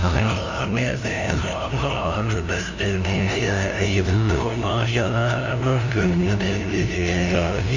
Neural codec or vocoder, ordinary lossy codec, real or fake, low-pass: codec, 16 kHz, 0.5 kbps, FunCodec, trained on LibriTTS, 25 frames a second; Opus, 64 kbps; fake; 7.2 kHz